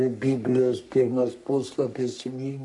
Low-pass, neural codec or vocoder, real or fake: 10.8 kHz; codec, 44.1 kHz, 3.4 kbps, Pupu-Codec; fake